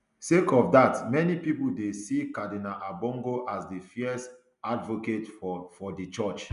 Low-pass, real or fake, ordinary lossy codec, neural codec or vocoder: 10.8 kHz; real; none; none